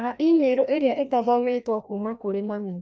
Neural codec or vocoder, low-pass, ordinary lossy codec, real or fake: codec, 16 kHz, 1 kbps, FreqCodec, larger model; none; none; fake